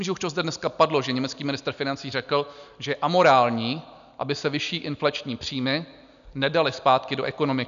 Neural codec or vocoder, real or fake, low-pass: none; real; 7.2 kHz